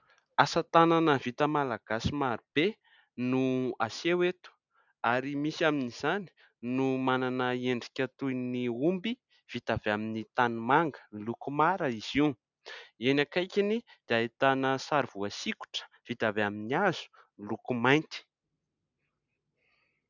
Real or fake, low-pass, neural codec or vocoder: real; 7.2 kHz; none